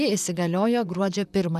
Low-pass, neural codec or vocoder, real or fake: 14.4 kHz; vocoder, 44.1 kHz, 128 mel bands, Pupu-Vocoder; fake